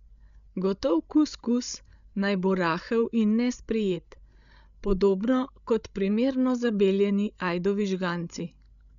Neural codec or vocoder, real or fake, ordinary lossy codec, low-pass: codec, 16 kHz, 16 kbps, FreqCodec, larger model; fake; none; 7.2 kHz